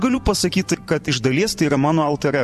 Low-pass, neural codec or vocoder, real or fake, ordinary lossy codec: 14.4 kHz; vocoder, 44.1 kHz, 128 mel bands every 512 samples, BigVGAN v2; fake; MP3, 64 kbps